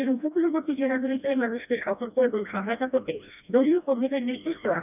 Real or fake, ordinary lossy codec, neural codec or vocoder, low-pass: fake; none; codec, 16 kHz, 1 kbps, FreqCodec, smaller model; 3.6 kHz